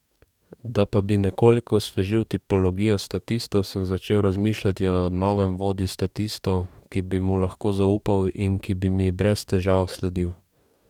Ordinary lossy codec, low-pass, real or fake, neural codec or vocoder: none; 19.8 kHz; fake; codec, 44.1 kHz, 2.6 kbps, DAC